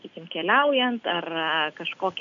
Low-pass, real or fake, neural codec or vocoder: 7.2 kHz; real; none